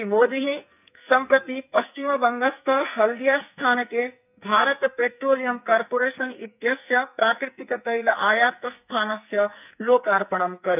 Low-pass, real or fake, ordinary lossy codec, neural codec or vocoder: 3.6 kHz; fake; none; codec, 44.1 kHz, 2.6 kbps, SNAC